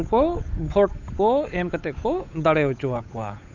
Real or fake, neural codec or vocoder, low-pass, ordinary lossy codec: fake; codec, 16 kHz, 8 kbps, FunCodec, trained on Chinese and English, 25 frames a second; 7.2 kHz; none